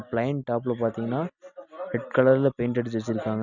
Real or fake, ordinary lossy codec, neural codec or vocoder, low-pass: real; none; none; 7.2 kHz